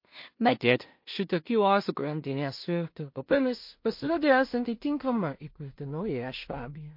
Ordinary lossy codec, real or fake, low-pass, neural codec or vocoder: MP3, 32 kbps; fake; 5.4 kHz; codec, 16 kHz in and 24 kHz out, 0.4 kbps, LongCat-Audio-Codec, two codebook decoder